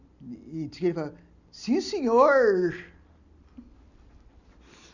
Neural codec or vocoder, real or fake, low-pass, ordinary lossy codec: none; real; 7.2 kHz; none